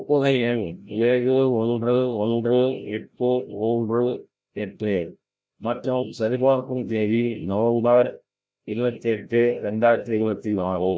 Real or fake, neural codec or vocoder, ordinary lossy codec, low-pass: fake; codec, 16 kHz, 0.5 kbps, FreqCodec, larger model; none; none